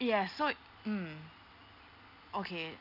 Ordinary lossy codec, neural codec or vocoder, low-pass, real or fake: none; none; 5.4 kHz; real